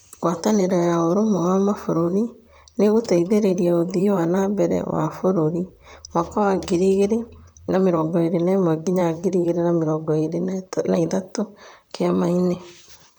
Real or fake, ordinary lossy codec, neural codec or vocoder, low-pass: fake; none; vocoder, 44.1 kHz, 128 mel bands, Pupu-Vocoder; none